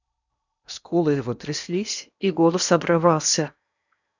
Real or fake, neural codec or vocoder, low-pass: fake; codec, 16 kHz in and 24 kHz out, 0.8 kbps, FocalCodec, streaming, 65536 codes; 7.2 kHz